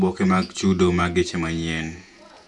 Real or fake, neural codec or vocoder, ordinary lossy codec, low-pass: fake; vocoder, 48 kHz, 128 mel bands, Vocos; MP3, 96 kbps; 10.8 kHz